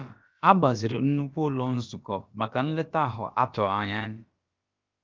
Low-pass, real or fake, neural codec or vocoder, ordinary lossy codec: 7.2 kHz; fake; codec, 16 kHz, about 1 kbps, DyCAST, with the encoder's durations; Opus, 32 kbps